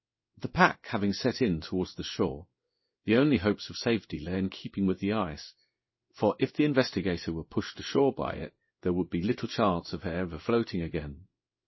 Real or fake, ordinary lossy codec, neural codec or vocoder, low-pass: fake; MP3, 24 kbps; codec, 16 kHz in and 24 kHz out, 1 kbps, XY-Tokenizer; 7.2 kHz